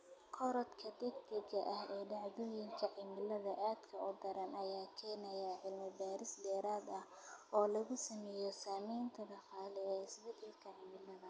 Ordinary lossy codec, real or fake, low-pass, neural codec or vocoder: none; real; none; none